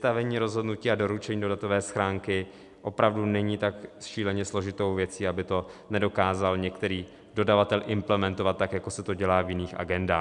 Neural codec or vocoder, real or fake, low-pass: none; real; 10.8 kHz